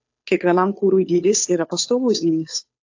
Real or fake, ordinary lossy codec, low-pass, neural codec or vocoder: fake; AAC, 48 kbps; 7.2 kHz; codec, 16 kHz, 2 kbps, FunCodec, trained on Chinese and English, 25 frames a second